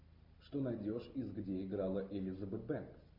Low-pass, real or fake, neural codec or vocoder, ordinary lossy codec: 5.4 kHz; real; none; MP3, 48 kbps